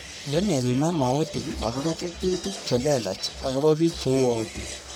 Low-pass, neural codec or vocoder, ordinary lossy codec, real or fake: none; codec, 44.1 kHz, 1.7 kbps, Pupu-Codec; none; fake